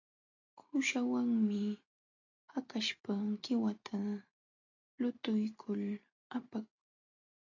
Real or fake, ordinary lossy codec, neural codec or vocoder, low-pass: real; AAC, 48 kbps; none; 7.2 kHz